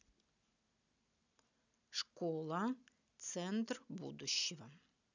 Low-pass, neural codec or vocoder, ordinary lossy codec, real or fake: 7.2 kHz; none; none; real